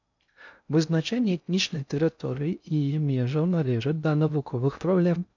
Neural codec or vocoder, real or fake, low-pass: codec, 16 kHz in and 24 kHz out, 0.6 kbps, FocalCodec, streaming, 2048 codes; fake; 7.2 kHz